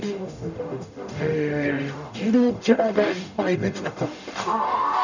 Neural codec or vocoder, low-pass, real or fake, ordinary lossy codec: codec, 44.1 kHz, 0.9 kbps, DAC; 7.2 kHz; fake; none